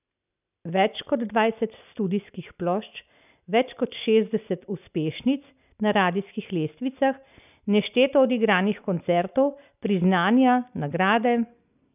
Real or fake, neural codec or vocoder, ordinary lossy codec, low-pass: real; none; none; 3.6 kHz